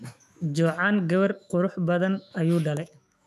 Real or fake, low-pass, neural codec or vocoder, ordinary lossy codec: fake; 14.4 kHz; autoencoder, 48 kHz, 128 numbers a frame, DAC-VAE, trained on Japanese speech; MP3, 96 kbps